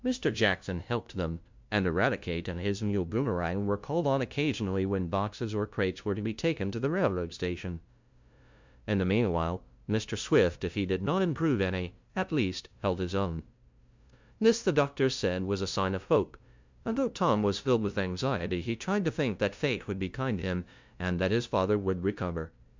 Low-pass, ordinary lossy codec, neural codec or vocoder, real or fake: 7.2 kHz; MP3, 64 kbps; codec, 16 kHz, 0.5 kbps, FunCodec, trained on LibriTTS, 25 frames a second; fake